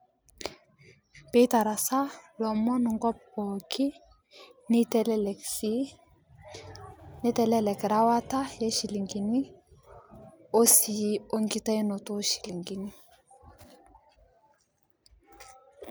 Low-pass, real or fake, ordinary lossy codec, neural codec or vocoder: none; real; none; none